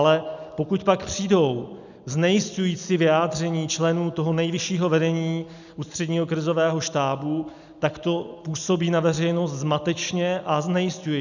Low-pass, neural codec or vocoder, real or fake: 7.2 kHz; none; real